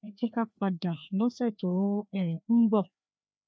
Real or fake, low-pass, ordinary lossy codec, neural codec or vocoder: fake; none; none; codec, 16 kHz, 2 kbps, FreqCodec, larger model